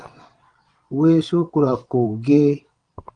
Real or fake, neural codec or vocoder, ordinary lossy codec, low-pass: fake; vocoder, 22.05 kHz, 80 mel bands, WaveNeXt; Opus, 24 kbps; 9.9 kHz